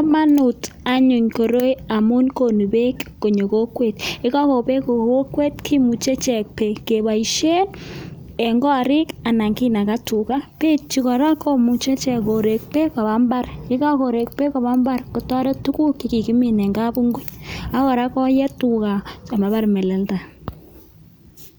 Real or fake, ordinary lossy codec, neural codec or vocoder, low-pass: real; none; none; none